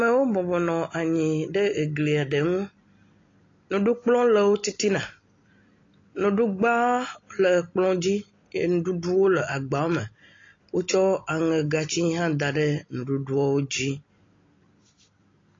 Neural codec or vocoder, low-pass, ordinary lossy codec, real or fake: none; 7.2 kHz; AAC, 32 kbps; real